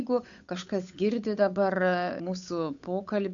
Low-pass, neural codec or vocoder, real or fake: 7.2 kHz; codec, 16 kHz, 8 kbps, FunCodec, trained on Chinese and English, 25 frames a second; fake